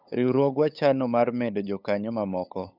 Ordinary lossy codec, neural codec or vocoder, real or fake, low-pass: none; codec, 16 kHz, 8 kbps, FunCodec, trained on LibriTTS, 25 frames a second; fake; 5.4 kHz